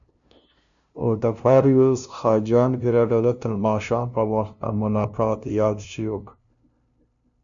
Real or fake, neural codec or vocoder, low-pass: fake; codec, 16 kHz, 0.5 kbps, FunCodec, trained on LibriTTS, 25 frames a second; 7.2 kHz